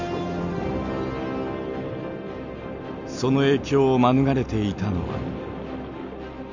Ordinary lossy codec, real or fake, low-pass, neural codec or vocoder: none; real; 7.2 kHz; none